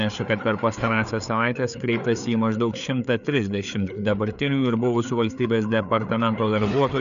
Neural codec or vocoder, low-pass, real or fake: codec, 16 kHz, 4 kbps, FreqCodec, larger model; 7.2 kHz; fake